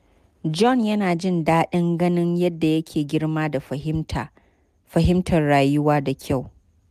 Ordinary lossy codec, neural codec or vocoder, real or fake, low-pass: MP3, 96 kbps; none; real; 14.4 kHz